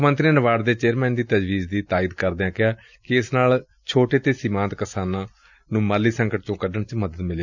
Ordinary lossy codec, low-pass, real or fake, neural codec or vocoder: none; 7.2 kHz; real; none